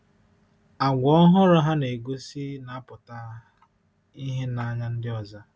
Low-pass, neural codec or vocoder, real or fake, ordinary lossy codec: none; none; real; none